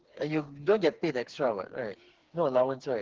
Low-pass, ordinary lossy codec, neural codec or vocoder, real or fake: 7.2 kHz; Opus, 16 kbps; codec, 16 kHz, 4 kbps, FreqCodec, smaller model; fake